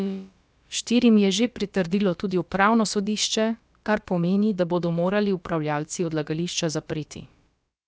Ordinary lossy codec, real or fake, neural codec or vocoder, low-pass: none; fake; codec, 16 kHz, about 1 kbps, DyCAST, with the encoder's durations; none